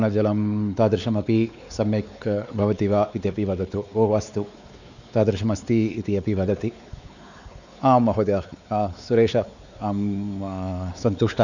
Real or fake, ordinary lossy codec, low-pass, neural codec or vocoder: fake; none; 7.2 kHz; codec, 16 kHz, 4 kbps, X-Codec, WavLM features, trained on Multilingual LibriSpeech